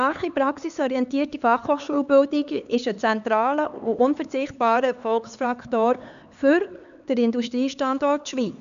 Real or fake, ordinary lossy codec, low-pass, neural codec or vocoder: fake; MP3, 96 kbps; 7.2 kHz; codec, 16 kHz, 4 kbps, X-Codec, HuBERT features, trained on LibriSpeech